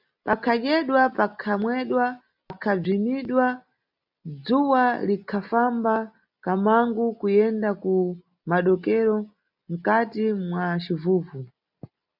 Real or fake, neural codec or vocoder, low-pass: real; none; 5.4 kHz